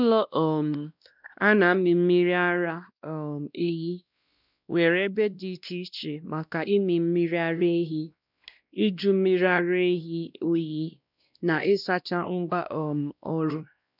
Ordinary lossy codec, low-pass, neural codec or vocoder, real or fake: none; 5.4 kHz; codec, 16 kHz, 1 kbps, X-Codec, WavLM features, trained on Multilingual LibriSpeech; fake